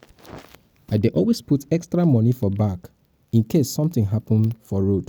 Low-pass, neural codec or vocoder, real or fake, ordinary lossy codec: none; none; real; none